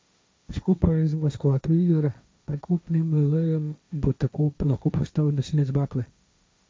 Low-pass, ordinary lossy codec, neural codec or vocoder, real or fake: none; none; codec, 16 kHz, 1.1 kbps, Voila-Tokenizer; fake